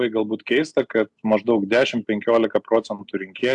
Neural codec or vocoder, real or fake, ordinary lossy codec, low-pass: none; real; AAC, 64 kbps; 10.8 kHz